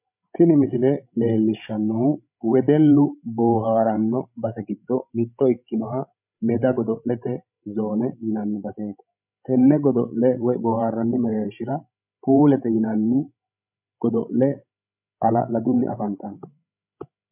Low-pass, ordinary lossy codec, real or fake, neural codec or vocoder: 3.6 kHz; MP3, 32 kbps; fake; codec, 16 kHz, 16 kbps, FreqCodec, larger model